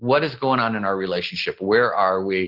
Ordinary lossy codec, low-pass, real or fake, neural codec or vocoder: Opus, 16 kbps; 5.4 kHz; real; none